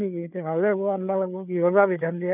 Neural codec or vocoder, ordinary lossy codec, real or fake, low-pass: codec, 16 kHz, 4 kbps, FreqCodec, larger model; none; fake; 3.6 kHz